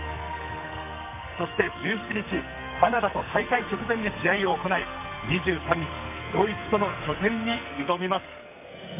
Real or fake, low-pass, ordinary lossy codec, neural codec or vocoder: fake; 3.6 kHz; none; codec, 44.1 kHz, 2.6 kbps, SNAC